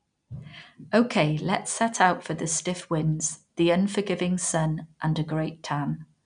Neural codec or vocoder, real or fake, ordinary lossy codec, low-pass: none; real; MP3, 96 kbps; 9.9 kHz